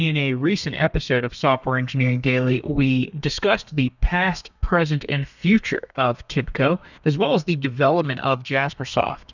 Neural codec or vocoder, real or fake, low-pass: codec, 32 kHz, 1.9 kbps, SNAC; fake; 7.2 kHz